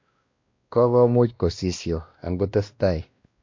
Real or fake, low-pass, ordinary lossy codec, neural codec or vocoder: fake; 7.2 kHz; MP3, 48 kbps; codec, 16 kHz, 1 kbps, X-Codec, WavLM features, trained on Multilingual LibriSpeech